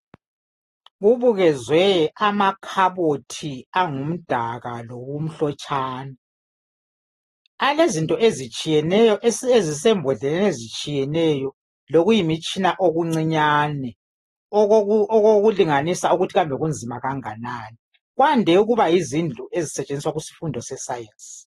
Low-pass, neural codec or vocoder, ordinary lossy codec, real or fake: 19.8 kHz; none; AAC, 32 kbps; real